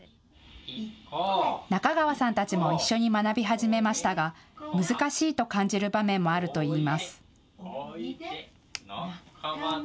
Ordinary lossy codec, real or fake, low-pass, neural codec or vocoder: none; real; none; none